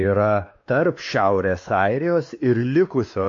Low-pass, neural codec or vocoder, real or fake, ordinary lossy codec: 7.2 kHz; codec, 16 kHz, 2 kbps, X-Codec, WavLM features, trained on Multilingual LibriSpeech; fake; MP3, 48 kbps